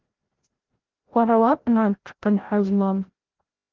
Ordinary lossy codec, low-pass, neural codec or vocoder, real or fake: Opus, 16 kbps; 7.2 kHz; codec, 16 kHz, 0.5 kbps, FreqCodec, larger model; fake